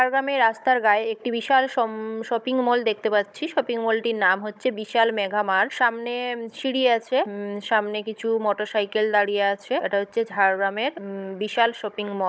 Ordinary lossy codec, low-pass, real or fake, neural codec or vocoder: none; none; fake; codec, 16 kHz, 16 kbps, FunCodec, trained on Chinese and English, 50 frames a second